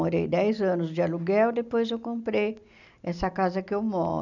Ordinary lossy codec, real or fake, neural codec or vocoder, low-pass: none; real; none; 7.2 kHz